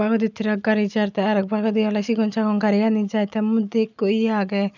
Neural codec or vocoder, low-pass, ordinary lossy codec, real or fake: none; 7.2 kHz; none; real